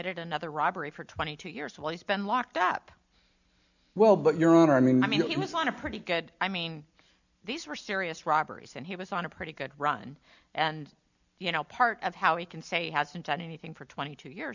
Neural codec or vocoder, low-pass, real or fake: none; 7.2 kHz; real